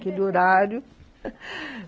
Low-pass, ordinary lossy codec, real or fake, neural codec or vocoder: none; none; real; none